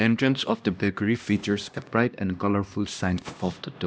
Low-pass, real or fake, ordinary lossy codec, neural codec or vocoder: none; fake; none; codec, 16 kHz, 1 kbps, X-Codec, HuBERT features, trained on LibriSpeech